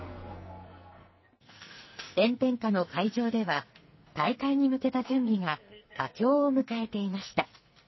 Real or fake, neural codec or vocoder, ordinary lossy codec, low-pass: fake; codec, 44.1 kHz, 2.6 kbps, SNAC; MP3, 24 kbps; 7.2 kHz